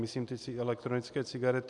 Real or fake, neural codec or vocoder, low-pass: real; none; 10.8 kHz